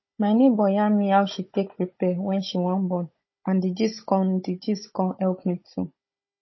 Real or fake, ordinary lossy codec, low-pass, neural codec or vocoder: fake; MP3, 24 kbps; 7.2 kHz; codec, 16 kHz, 16 kbps, FunCodec, trained on Chinese and English, 50 frames a second